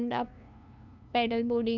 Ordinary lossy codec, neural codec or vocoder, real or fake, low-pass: none; codec, 16 kHz, 2 kbps, FunCodec, trained on LibriTTS, 25 frames a second; fake; 7.2 kHz